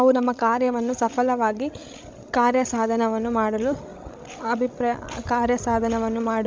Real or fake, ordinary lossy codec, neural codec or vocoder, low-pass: fake; none; codec, 16 kHz, 16 kbps, FreqCodec, larger model; none